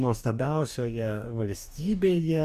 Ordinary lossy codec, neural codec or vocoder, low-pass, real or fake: Opus, 64 kbps; codec, 44.1 kHz, 2.6 kbps, DAC; 14.4 kHz; fake